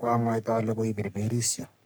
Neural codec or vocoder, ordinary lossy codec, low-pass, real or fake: codec, 44.1 kHz, 3.4 kbps, Pupu-Codec; none; none; fake